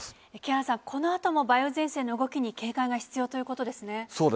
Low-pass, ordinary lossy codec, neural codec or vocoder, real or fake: none; none; none; real